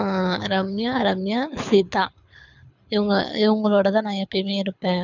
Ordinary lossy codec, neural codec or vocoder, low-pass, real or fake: none; codec, 24 kHz, 6 kbps, HILCodec; 7.2 kHz; fake